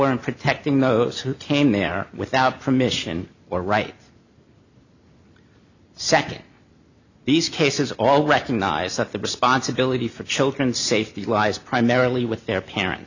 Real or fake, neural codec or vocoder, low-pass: real; none; 7.2 kHz